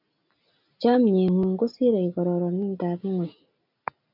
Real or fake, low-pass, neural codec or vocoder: real; 5.4 kHz; none